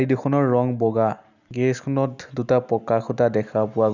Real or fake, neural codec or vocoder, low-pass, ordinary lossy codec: real; none; 7.2 kHz; none